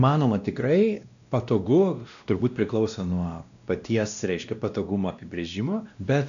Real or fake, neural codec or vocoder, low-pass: fake; codec, 16 kHz, 1 kbps, X-Codec, WavLM features, trained on Multilingual LibriSpeech; 7.2 kHz